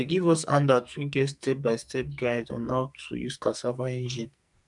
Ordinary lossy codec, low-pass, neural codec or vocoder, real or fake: none; 10.8 kHz; codec, 44.1 kHz, 2.6 kbps, SNAC; fake